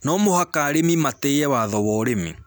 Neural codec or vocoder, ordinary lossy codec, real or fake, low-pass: none; none; real; none